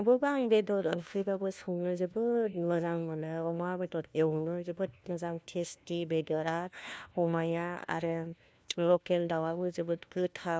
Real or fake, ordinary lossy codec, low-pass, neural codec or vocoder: fake; none; none; codec, 16 kHz, 1 kbps, FunCodec, trained on LibriTTS, 50 frames a second